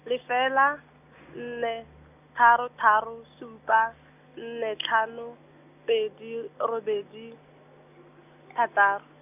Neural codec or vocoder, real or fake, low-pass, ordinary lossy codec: none; real; 3.6 kHz; none